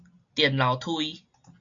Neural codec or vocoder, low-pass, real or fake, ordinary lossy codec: none; 7.2 kHz; real; MP3, 64 kbps